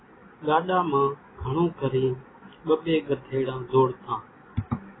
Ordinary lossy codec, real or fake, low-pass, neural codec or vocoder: AAC, 16 kbps; real; 7.2 kHz; none